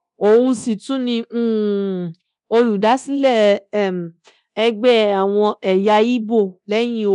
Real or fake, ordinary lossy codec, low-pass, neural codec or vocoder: fake; none; 10.8 kHz; codec, 24 kHz, 0.9 kbps, DualCodec